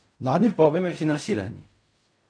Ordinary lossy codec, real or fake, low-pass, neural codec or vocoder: AAC, 64 kbps; fake; 9.9 kHz; codec, 16 kHz in and 24 kHz out, 0.4 kbps, LongCat-Audio-Codec, fine tuned four codebook decoder